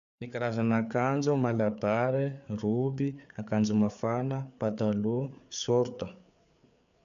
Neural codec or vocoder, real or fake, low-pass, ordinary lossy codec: codec, 16 kHz, 4 kbps, FreqCodec, larger model; fake; 7.2 kHz; none